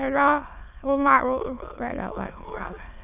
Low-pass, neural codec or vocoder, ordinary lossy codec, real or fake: 3.6 kHz; autoencoder, 22.05 kHz, a latent of 192 numbers a frame, VITS, trained on many speakers; none; fake